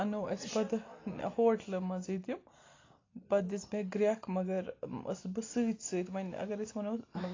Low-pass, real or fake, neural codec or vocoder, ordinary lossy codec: 7.2 kHz; real; none; AAC, 32 kbps